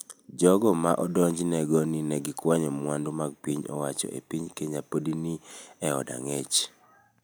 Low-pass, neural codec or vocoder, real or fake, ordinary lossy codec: none; none; real; none